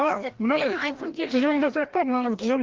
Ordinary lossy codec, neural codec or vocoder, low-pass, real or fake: Opus, 16 kbps; codec, 16 kHz, 1 kbps, FreqCodec, larger model; 7.2 kHz; fake